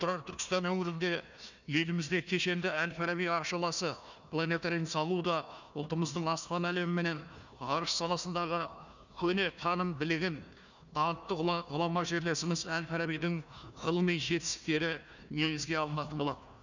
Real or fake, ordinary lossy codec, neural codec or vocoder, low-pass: fake; none; codec, 16 kHz, 1 kbps, FunCodec, trained on Chinese and English, 50 frames a second; 7.2 kHz